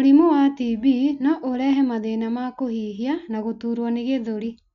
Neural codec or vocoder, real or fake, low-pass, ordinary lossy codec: none; real; 7.2 kHz; none